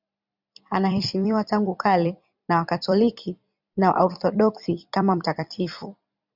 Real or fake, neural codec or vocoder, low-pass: fake; vocoder, 44.1 kHz, 128 mel bands every 256 samples, BigVGAN v2; 5.4 kHz